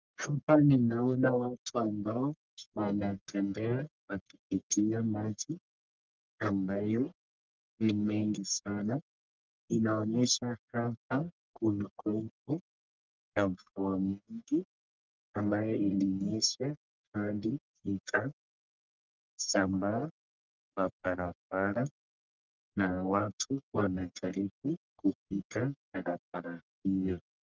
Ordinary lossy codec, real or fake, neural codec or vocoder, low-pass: Opus, 32 kbps; fake; codec, 44.1 kHz, 1.7 kbps, Pupu-Codec; 7.2 kHz